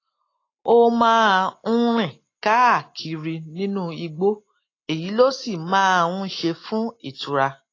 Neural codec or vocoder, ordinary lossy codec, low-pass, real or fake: none; AAC, 32 kbps; 7.2 kHz; real